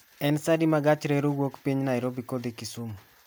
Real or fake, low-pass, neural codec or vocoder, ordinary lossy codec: real; none; none; none